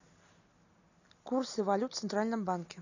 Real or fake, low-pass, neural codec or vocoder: real; 7.2 kHz; none